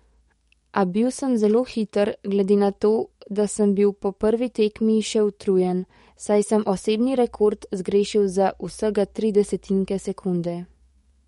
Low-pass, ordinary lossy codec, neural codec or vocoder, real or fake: 19.8 kHz; MP3, 48 kbps; codec, 44.1 kHz, 7.8 kbps, DAC; fake